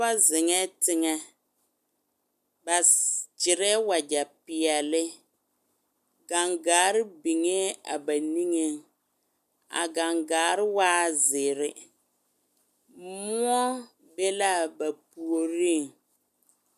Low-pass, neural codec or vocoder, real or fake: 14.4 kHz; none; real